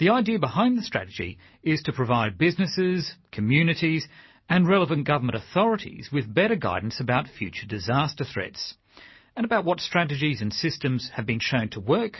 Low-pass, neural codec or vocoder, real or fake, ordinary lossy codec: 7.2 kHz; none; real; MP3, 24 kbps